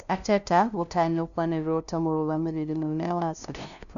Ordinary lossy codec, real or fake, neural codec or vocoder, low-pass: none; fake; codec, 16 kHz, 0.5 kbps, FunCodec, trained on LibriTTS, 25 frames a second; 7.2 kHz